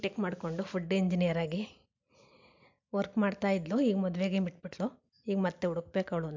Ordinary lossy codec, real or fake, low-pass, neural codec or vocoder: MP3, 64 kbps; real; 7.2 kHz; none